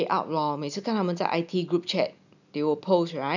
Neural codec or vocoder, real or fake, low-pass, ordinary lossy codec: none; real; 7.2 kHz; none